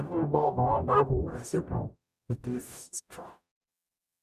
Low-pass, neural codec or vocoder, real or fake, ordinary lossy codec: 14.4 kHz; codec, 44.1 kHz, 0.9 kbps, DAC; fake; none